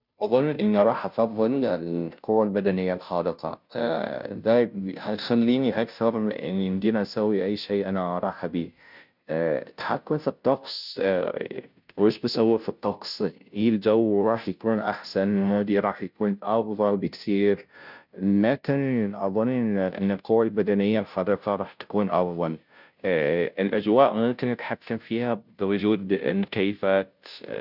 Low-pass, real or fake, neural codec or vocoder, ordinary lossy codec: 5.4 kHz; fake; codec, 16 kHz, 0.5 kbps, FunCodec, trained on Chinese and English, 25 frames a second; none